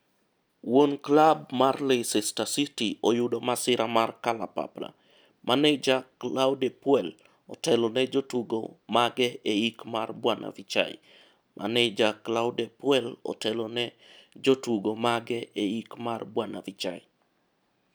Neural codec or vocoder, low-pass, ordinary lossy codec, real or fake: none; none; none; real